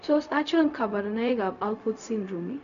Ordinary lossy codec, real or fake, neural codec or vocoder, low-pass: none; fake; codec, 16 kHz, 0.4 kbps, LongCat-Audio-Codec; 7.2 kHz